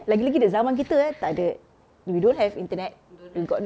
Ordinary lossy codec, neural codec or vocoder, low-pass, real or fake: none; none; none; real